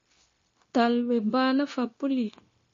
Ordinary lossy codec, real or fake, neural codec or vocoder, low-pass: MP3, 32 kbps; fake; codec, 16 kHz, 0.9 kbps, LongCat-Audio-Codec; 7.2 kHz